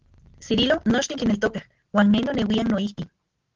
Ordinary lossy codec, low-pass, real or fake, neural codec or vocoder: Opus, 16 kbps; 7.2 kHz; real; none